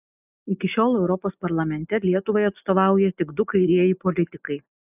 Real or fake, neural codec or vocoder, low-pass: real; none; 3.6 kHz